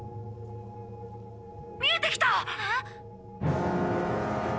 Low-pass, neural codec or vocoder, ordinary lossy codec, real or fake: none; none; none; real